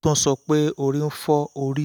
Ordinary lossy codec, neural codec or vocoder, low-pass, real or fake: none; none; none; real